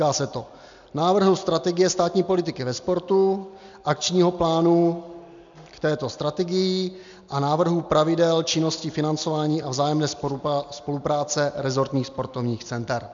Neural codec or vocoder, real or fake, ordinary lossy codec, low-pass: none; real; MP3, 64 kbps; 7.2 kHz